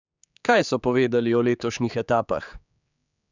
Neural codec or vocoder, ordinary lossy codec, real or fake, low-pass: codec, 16 kHz, 4 kbps, X-Codec, HuBERT features, trained on general audio; none; fake; 7.2 kHz